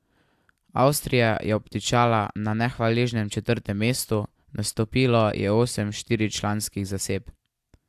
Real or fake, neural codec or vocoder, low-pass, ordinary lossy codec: real; none; 14.4 kHz; AAC, 96 kbps